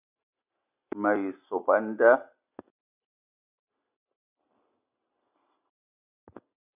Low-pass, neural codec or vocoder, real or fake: 3.6 kHz; none; real